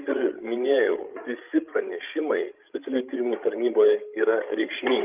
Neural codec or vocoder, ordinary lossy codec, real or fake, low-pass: codec, 16 kHz, 16 kbps, FreqCodec, larger model; Opus, 32 kbps; fake; 3.6 kHz